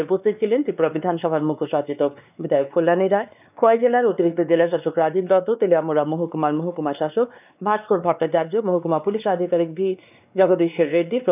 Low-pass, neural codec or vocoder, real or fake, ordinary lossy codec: 3.6 kHz; codec, 16 kHz, 2 kbps, X-Codec, WavLM features, trained on Multilingual LibriSpeech; fake; none